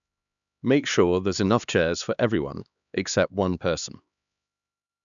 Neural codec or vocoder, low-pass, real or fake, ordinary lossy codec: codec, 16 kHz, 2 kbps, X-Codec, HuBERT features, trained on LibriSpeech; 7.2 kHz; fake; none